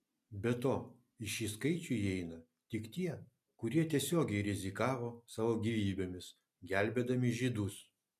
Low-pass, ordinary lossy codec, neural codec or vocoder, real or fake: 14.4 kHz; MP3, 96 kbps; none; real